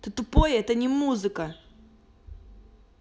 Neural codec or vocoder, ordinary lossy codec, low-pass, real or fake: none; none; none; real